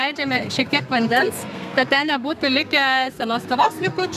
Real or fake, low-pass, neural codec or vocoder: fake; 14.4 kHz; codec, 32 kHz, 1.9 kbps, SNAC